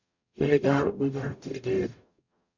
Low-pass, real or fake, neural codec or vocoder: 7.2 kHz; fake; codec, 44.1 kHz, 0.9 kbps, DAC